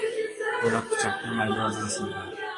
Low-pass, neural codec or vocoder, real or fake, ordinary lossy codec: 10.8 kHz; codec, 44.1 kHz, 7.8 kbps, DAC; fake; AAC, 32 kbps